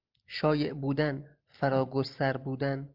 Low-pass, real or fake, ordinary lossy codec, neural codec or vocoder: 5.4 kHz; real; Opus, 32 kbps; none